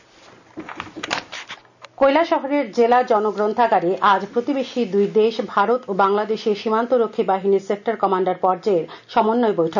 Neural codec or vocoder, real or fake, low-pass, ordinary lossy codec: none; real; 7.2 kHz; none